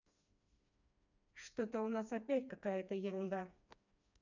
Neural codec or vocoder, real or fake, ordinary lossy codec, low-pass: codec, 16 kHz, 2 kbps, FreqCodec, smaller model; fake; none; 7.2 kHz